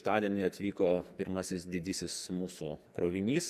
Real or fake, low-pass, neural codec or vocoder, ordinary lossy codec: fake; 14.4 kHz; codec, 44.1 kHz, 2.6 kbps, SNAC; Opus, 64 kbps